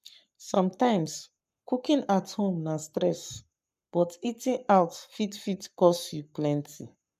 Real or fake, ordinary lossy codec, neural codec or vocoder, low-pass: fake; MP3, 96 kbps; codec, 44.1 kHz, 7.8 kbps, Pupu-Codec; 14.4 kHz